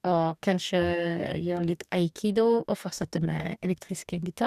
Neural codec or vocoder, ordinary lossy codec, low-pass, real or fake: codec, 44.1 kHz, 2.6 kbps, DAC; none; 14.4 kHz; fake